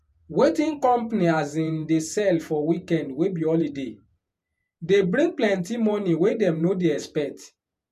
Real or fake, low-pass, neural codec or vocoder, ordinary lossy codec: fake; 14.4 kHz; vocoder, 48 kHz, 128 mel bands, Vocos; none